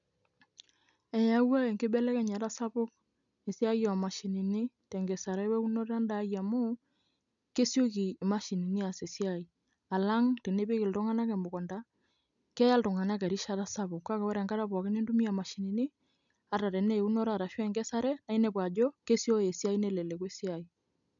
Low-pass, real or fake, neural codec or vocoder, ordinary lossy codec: 7.2 kHz; real; none; none